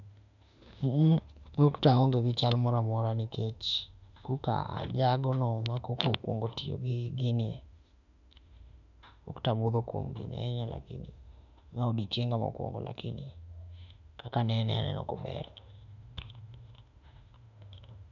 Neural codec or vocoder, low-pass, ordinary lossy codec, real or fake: autoencoder, 48 kHz, 32 numbers a frame, DAC-VAE, trained on Japanese speech; 7.2 kHz; none; fake